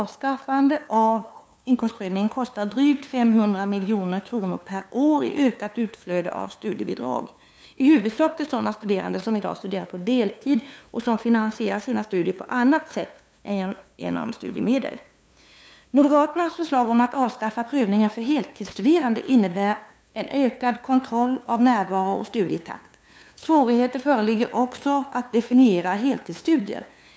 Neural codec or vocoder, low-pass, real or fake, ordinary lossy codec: codec, 16 kHz, 2 kbps, FunCodec, trained on LibriTTS, 25 frames a second; none; fake; none